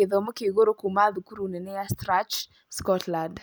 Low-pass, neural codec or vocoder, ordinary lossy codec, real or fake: none; none; none; real